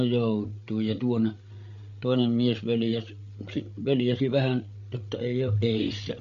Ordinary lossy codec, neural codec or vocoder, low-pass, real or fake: MP3, 48 kbps; codec, 16 kHz, 8 kbps, FreqCodec, larger model; 7.2 kHz; fake